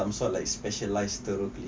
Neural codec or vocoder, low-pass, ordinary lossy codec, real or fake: none; none; none; real